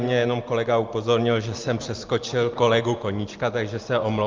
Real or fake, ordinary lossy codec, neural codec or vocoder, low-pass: real; Opus, 32 kbps; none; 7.2 kHz